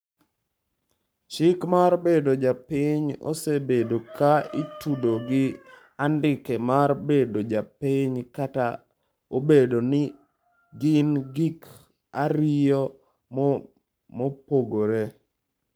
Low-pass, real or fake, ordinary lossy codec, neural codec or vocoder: none; fake; none; codec, 44.1 kHz, 7.8 kbps, Pupu-Codec